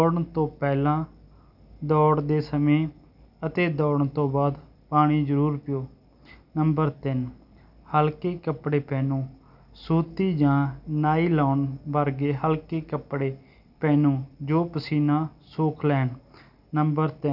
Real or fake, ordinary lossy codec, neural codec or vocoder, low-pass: real; none; none; 5.4 kHz